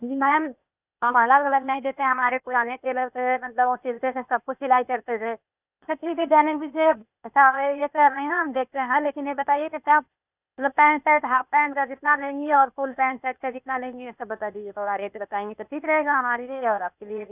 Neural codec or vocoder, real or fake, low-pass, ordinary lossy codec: codec, 16 kHz, 0.8 kbps, ZipCodec; fake; 3.6 kHz; none